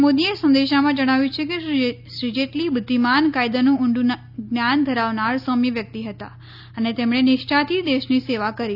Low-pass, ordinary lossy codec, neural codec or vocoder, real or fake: 5.4 kHz; none; none; real